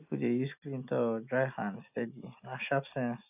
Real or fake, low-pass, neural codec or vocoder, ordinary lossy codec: real; 3.6 kHz; none; AAC, 32 kbps